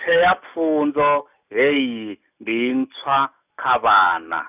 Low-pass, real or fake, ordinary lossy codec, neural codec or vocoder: 3.6 kHz; real; none; none